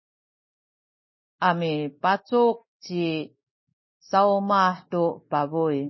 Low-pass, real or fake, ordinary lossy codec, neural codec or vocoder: 7.2 kHz; real; MP3, 24 kbps; none